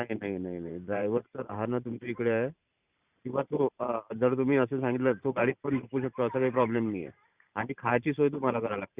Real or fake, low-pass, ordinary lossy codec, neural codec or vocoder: fake; 3.6 kHz; Opus, 64 kbps; vocoder, 44.1 kHz, 128 mel bands every 512 samples, BigVGAN v2